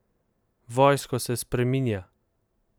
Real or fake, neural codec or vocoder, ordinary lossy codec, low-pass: real; none; none; none